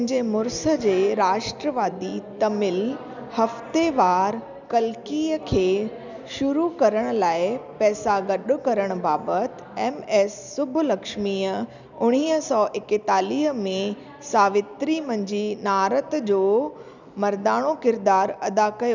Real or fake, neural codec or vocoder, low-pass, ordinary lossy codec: real; none; 7.2 kHz; none